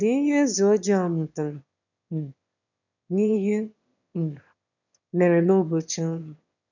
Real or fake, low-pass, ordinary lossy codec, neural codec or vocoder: fake; 7.2 kHz; none; autoencoder, 22.05 kHz, a latent of 192 numbers a frame, VITS, trained on one speaker